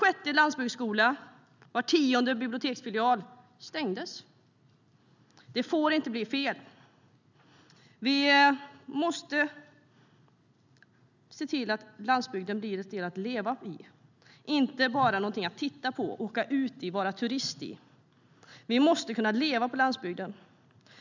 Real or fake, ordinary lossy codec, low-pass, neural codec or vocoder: real; none; 7.2 kHz; none